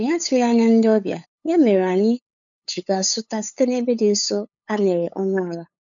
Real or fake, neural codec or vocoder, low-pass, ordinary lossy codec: fake; codec, 16 kHz, 8 kbps, FunCodec, trained on LibriTTS, 25 frames a second; 7.2 kHz; none